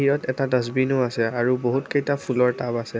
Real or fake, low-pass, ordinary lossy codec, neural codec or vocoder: real; none; none; none